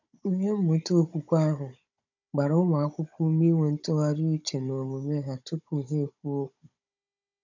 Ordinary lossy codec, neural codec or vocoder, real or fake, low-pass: none; codec, 16 kHz, 16 kbps, FunCodec, trained on Chinese and English, 50 frames a second; fake; 7.2 kHz